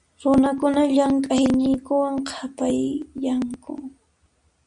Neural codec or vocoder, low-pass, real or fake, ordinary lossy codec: none; 9.9 kHz; real; Opus, 64 kbps